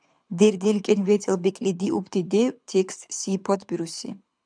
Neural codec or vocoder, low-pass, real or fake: codec, 24 kHz, 6 kbps, HILCodec; 9.9 kHz; fake